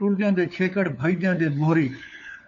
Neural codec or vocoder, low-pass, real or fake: codec, 16 kHz, 4 kbps, FunCodec, trained on LibriTTS, 50 frames a second; 7.2 kHz; fake